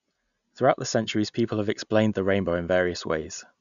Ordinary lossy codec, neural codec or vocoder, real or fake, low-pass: none; none; real; 7.2 kHz